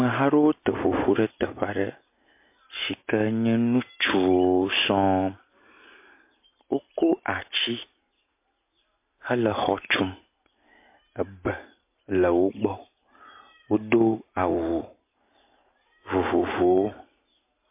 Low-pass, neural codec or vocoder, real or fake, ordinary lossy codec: 3.6 kHz; none; real; MP3, 24 kbps